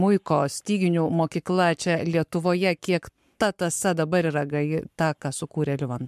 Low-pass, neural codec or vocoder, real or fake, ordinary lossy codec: 14.4 kHz; codec, 44.1 kHz, 7.8 kbps, Pupu-Codec; fake; MP3, 96 kbps